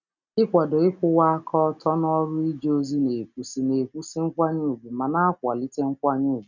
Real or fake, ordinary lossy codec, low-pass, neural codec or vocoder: real; none; 7.2 kHz; none